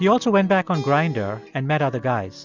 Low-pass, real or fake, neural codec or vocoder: 7.2 kHz; real; none